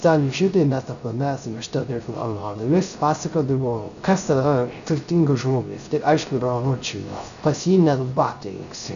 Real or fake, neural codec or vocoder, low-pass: fake; codec, 16 kHz, 0.3 kbps, FocalCodec; 7.2 kHz